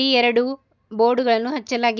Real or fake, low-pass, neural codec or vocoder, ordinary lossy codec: real; 7.2 kHz; none; none